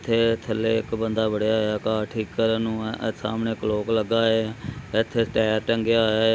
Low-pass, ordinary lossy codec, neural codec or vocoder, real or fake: none; none; none; real